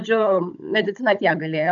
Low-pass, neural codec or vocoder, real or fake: 7.2 kHz; codec, 16 kHz, 16 kbps, FunCodec, trained on Chinese and English, 50 frames a second; fake